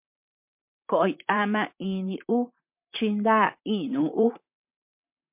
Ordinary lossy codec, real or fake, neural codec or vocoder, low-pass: MP3, 32 kbps; fake; vocoder, 44.1 kHz, 128 mel bands, Pupu-Vocoder; 3.6 kHz